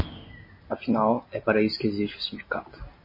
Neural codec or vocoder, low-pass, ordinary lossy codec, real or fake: none; 5.4 kHz; MP3, 24 kbps; real